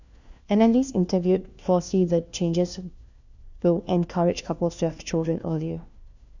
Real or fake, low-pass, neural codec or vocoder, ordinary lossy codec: fake; 7.2 kHz; codec, 16 kHz, 1 kbps, FunCodec, trained on LibriTTS, 50 frames a second; none